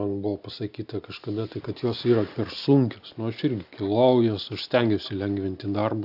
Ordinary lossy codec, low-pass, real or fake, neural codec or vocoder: AAC, 48 kbps; 5.4 kHz; real; none